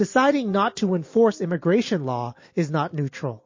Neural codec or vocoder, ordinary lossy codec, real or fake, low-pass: none; MP3, 32 kbps; real; 7.2 kHz